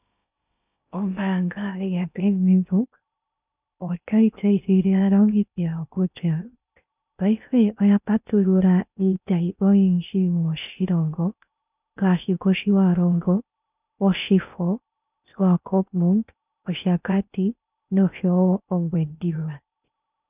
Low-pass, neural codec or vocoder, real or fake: 3.6 kHz; codec, 16 kHz in and 24 kHz out, 0.6 kbps, FocalCodec, streaming, 2048 codes; fake